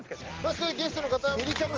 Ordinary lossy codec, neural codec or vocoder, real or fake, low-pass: Opus, 16 kbps; none; real; 7.2 kHz